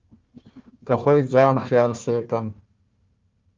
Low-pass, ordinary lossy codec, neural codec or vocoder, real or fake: 7.2 kHz; Opus, 32 kbps; codec, 16 kHz, 1 kbps, FunCodec, trained on Chinese and English, 50 frames a second; fake